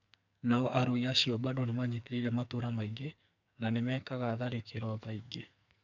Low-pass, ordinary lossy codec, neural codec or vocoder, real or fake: 7.2 kHz; none; codec, 44.1 kHz, 2.6 kbps, SNAC; fake